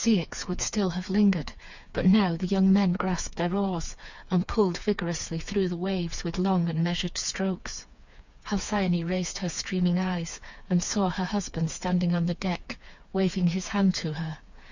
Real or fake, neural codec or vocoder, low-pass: fake; codec, 16 kHz, 4 kbps, FreqCodec, smaller model; 7.2 kHz